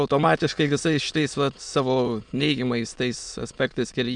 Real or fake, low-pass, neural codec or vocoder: fake; 9.9 kHz; autoencoder, 22.05 kHz, a latent of 192 numbers a frame, VITS, trained on many speakers